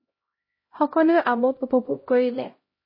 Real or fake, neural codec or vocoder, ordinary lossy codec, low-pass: fake; codec, 16 kHz, 0.5 kbps, X-Codec, HuBERT features, trained on LibriSpeech; MP3, 24 kbps; 5.4 kHz